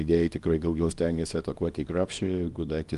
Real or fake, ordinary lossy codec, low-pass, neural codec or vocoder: fake; Opus, 32 kbps; 10.8 kHz; codec, 24 kHz, 0.9 kbps, WavTokenizer, small release